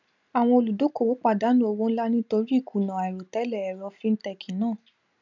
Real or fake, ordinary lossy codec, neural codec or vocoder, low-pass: real; none; none; 7.2 kHz